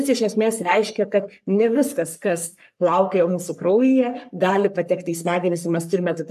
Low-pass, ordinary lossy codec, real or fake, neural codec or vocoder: 14.4 kHz; AAC, 96 kbps; fake; codec, 44.1 kHz, 3.4 kbps, Pupu-Codec